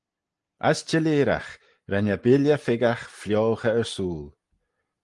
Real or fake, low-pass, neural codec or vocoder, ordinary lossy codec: real; 10.8 kHz; none; Opus, 24 kbps